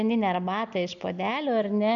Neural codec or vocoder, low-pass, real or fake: none; 7.2 kHz; real